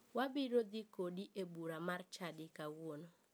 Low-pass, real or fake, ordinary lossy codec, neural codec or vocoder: none; real; none; none